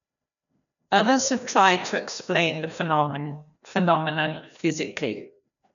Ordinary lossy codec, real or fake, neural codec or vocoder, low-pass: none; fake; codec, 16 kHz, 1 kbps, FreqCodec, larger model; 7.2 kHz